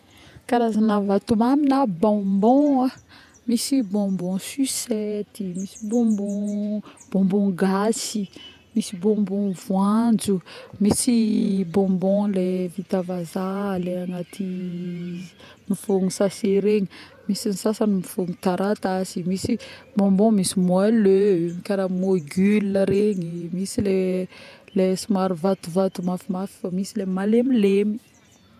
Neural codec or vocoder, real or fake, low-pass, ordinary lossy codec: vocoder, 48 kHz, 128 mel bands, Vocos; fake; 14.4 kHz; none